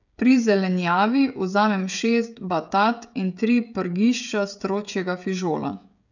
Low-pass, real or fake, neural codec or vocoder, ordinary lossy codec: 7.2 kHz; fake; codec, 16 kHz, 16 kbps, FreqCodec, smaller model; none